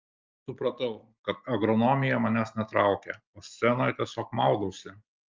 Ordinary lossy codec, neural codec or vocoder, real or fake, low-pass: Opus, 24 kbps; none; real; 7.2 kHz